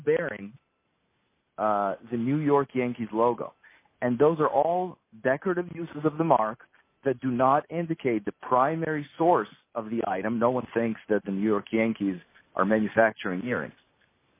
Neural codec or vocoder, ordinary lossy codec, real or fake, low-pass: none; MP3, 24 kbps; real; 3.6 kHz